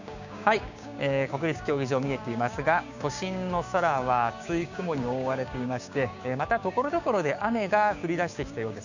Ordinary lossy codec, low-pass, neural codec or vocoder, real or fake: none; 7.2 kHz; codec, 16 kHz, 6 kbps, DAC; fake